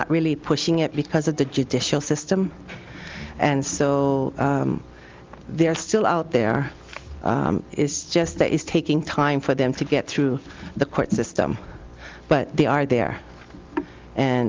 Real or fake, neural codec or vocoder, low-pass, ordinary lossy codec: real; none; 7.2 kHz; Opus, 32 kbps